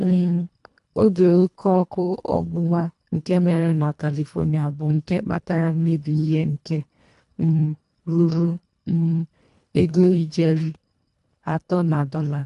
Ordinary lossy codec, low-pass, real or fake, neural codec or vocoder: none; 10.8 kHz; fake; codec, 24 kHz, 1.5 kbps, HILCodec